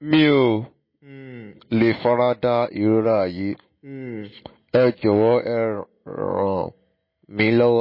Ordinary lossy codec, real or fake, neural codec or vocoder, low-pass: MP3, 24 kbps; real; none; 5.4 kHz